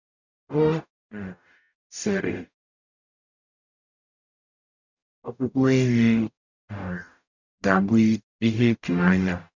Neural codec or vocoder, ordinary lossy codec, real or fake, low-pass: codec, 44.1 kHz, 0.9 kbps, DAC; none; fake; 7.2 kHz